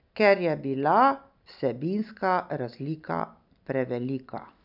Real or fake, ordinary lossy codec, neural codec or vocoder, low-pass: real; none; none; 5.4 kHz